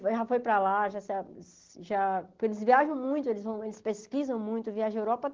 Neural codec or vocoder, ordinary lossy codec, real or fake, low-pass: none; Opus, 16 kbps; real; 7.2 kHz